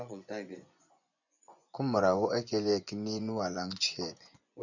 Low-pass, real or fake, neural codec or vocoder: 7.2 kHz; fake; vocoder, 24 kHz, 100 mel bands, Vocos